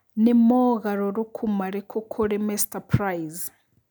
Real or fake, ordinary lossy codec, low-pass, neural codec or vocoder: real; none; none; none